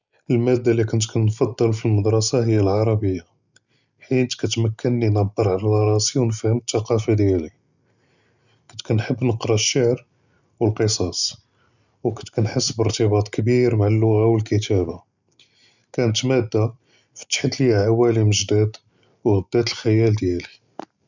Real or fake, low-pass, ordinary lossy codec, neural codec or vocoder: real; 7.2 kHz; none; none